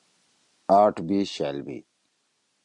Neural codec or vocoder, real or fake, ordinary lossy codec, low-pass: none; real; MP3, 64 kbps; 10.8 kHz